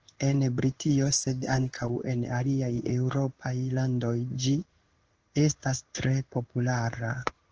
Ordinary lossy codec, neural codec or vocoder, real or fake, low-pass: Opus, 16 kbps; codec, 16 kHz in and 24 kHz out, 1 kbps, XY-Tokenizer; fake; 7.2 kHz